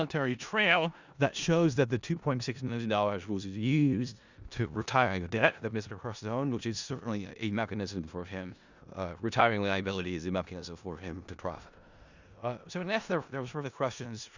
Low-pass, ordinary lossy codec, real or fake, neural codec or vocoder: 7.2 kHz; Opus, 64 kbps; fake; codec, 16 kHz in and 24 kHz out, 0.4 kbps, LongCat-Audio-Codec, four codebook decoder